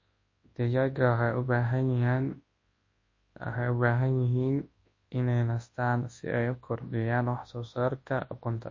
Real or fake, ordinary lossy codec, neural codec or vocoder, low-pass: fake; MP3, 32 kbps; codec, 24 kHz, 0.9 kbps, WavTokenizer, large speech release; 7.2 kHz